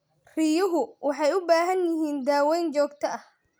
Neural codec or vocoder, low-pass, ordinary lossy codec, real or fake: none; none; none; real